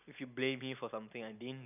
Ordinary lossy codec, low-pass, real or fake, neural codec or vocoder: none; 3.6 kHz; real; none